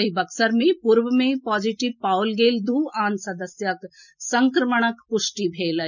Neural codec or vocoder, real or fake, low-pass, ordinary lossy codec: none; real; 7.2 kHz; none